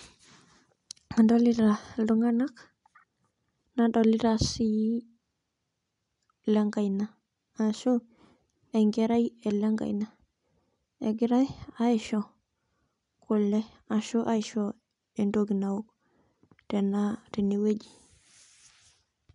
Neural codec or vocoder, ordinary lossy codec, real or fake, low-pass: none; none; real; 10.8 kHz